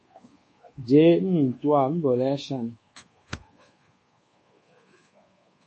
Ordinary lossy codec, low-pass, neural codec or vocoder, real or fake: MP3, 32 kbps; 10.8 kHz; codec, 24 kHz, 1.2 kbps, DualCodec; fake